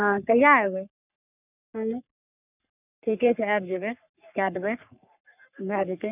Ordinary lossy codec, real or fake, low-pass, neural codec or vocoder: none; fake; 3.6 kHz; codec, 44.1 kHz, 3.4 kbps, Pupu-Codec